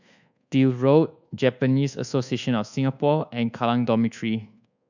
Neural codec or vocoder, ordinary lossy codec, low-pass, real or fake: codec, 24 kHz, 1.2 kbps, DualCodec; none; 7.2 kHz; fake